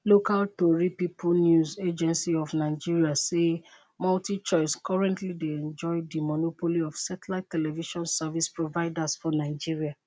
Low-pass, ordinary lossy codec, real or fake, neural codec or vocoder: none; none; real; none